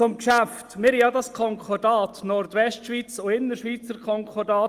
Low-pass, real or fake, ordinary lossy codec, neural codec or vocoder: 14.4 kHz; real; Opus, 32 kbps; none